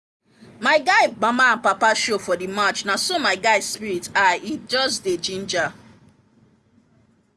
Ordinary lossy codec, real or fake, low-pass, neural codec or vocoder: none; real; none; none